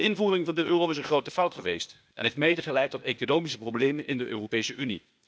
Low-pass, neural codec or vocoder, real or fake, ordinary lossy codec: none; codec, 16 kHz, 0.8 kbps, ZipCodec; fake; none